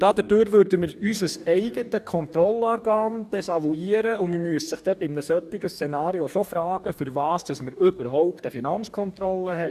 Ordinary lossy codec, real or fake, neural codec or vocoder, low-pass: none; fake; codec, 44.1 kHz, 2.6 kbps, DAC; 14.4 kHz